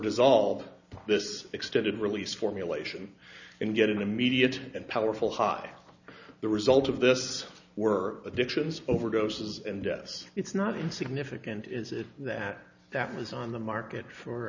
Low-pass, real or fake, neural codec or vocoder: 7.2 kHz; real; none